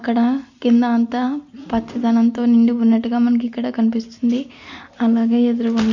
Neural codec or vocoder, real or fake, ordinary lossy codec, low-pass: none; real; none; 7.2 kHz